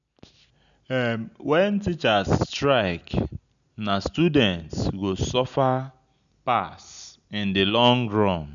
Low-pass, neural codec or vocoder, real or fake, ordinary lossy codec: 7.2 kHz; none; real; none